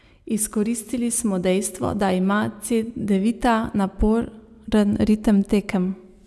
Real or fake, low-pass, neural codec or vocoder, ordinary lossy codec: real; none; none; none